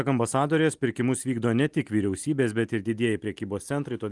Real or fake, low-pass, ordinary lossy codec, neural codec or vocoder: real; 10.8 kHz; Opus, 24 kbps; none